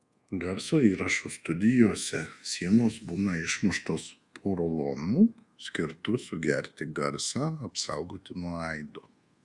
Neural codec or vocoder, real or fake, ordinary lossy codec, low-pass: codec, 24 kHz, 1.2 kbps, DualCodec; fake; Opus, 64 kbps; 10.8 kHz